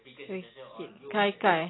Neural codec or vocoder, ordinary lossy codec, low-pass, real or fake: none; AAC, 16 kbps; 7.2 kHz; real